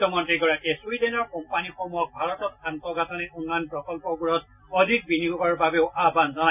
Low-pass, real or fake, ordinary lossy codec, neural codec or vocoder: 3.6 kHz; real; none; none